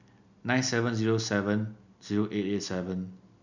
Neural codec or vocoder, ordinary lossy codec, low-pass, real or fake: none; none; 7.2 kHz; real